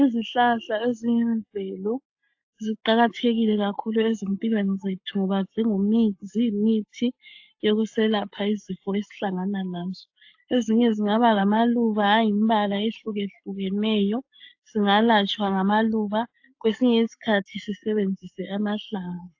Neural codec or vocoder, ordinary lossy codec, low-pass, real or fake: codec, 44.1 kHz, 7.8 kbps, Pupu-Codec; AAC, 48 kbps; 7.2 kHz; fake